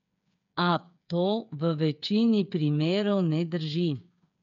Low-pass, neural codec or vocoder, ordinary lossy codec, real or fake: 7.2 kHz; codec, 16 kHz, 8 kbps, FreqCodec, smaller model; none; fake